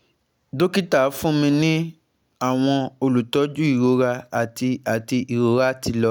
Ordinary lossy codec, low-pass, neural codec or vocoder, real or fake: none; 19.8 kHz; none; real